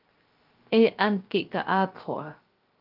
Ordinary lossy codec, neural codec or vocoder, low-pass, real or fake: Opus, 32 kbps; codec, 16 kHz, 0.3 kbps, FocalCodec; 5.4 kHz; fake